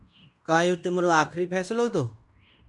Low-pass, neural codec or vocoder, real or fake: 10.8 kHz; codec, 16 kHz in and 24 kHz out, 0.9 kbps, LongCat-Audio-Codec, fine tuned four codebook decoder; fake